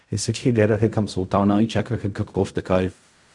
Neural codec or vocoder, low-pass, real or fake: codec, 16 kHz in and 24 kHz out, 0.4 kbps, LongCat-Audio-Codec, fine tuned four codebook decoder; 10.8 kHz; fake